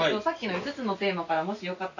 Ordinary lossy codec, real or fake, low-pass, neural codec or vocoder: none; real; 7.2 kHz; none